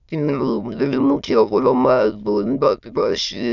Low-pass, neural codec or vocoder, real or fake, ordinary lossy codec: 7.2 kHz; autoencoder, 22.05 kHz, a latent of 192 numbers a frame, VITS, trained on many speakers; fake; none